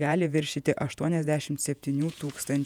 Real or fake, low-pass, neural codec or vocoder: fake; 19.8 kHz; vocoder, 48 kHz, 128 mel bands, Vocos